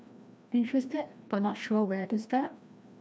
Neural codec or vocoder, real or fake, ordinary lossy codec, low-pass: codec, 16 kHz, 1 kbps, FreqCodec, larger model; fake; none; none